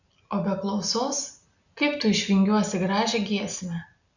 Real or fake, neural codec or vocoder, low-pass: real; none; 7.2 kHz